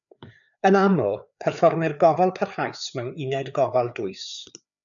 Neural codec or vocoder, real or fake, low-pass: codec, 16 kHz, 4 kbps, FreqCodec, larger model; fake; 7.2 kHz